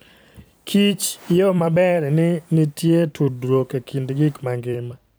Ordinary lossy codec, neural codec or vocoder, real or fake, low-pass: none; vocoder, 44.1 kHz, 128 mel bands every 256 samples, BigVGAN v2; fake; none